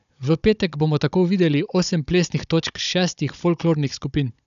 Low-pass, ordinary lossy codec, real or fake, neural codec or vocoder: 7.2 kHz; none; fake; codec, 16 kHz, 16 kbps, FunCodec, trained on Chinese and English, 50 frames a second